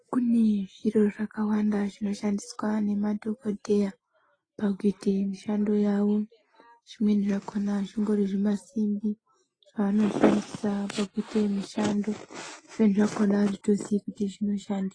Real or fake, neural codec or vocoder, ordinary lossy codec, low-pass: real; none; AAC, 32 kbps; 9.9 kHz